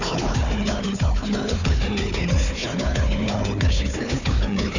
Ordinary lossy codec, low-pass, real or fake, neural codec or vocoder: none; 7.2 kHz; fake; codec, 16 kHz, 4 kbps, FunCodec, trained on Chinese and English, 50 frames a second